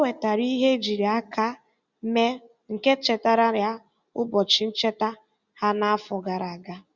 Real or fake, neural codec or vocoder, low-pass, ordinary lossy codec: real; none; 7.2 kHz; Opus, 64 kbps